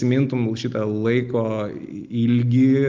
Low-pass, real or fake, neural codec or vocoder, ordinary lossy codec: 7.2 kHz; real; none; Opus, 32 kbps